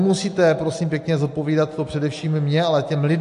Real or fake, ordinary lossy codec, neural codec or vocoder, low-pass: real; AAC, 64 kbps; none; 10.8 kHz